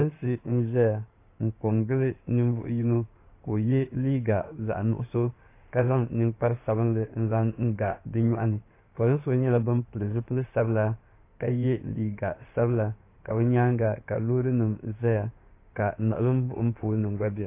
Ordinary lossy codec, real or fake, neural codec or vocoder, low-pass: AAC, 24 kbps; fake; codec, 16 kHz in and 24 kHz out, 2.2 kbps, FireRedTTS-2 codec; 3.6 kHz